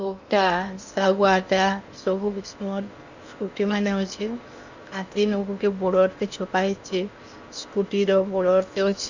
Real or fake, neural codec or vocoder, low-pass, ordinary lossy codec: fake; codec, 16 kHz in and 24 kHz out, 0.8 kbps, FocalCodec, streaming, 65536 codes; 7.2 kHz; Opus, 64 kbps